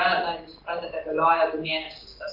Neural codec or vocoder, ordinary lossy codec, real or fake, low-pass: vocoder, 44.1 kHz, 128 mel bands every 512 samples, BigVGAN v2; Opus, 32 kbps; fake; 5.4 kHz